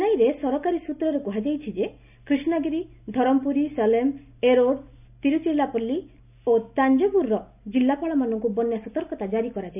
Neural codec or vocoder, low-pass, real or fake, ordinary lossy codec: none; 3.6 kHz; real; none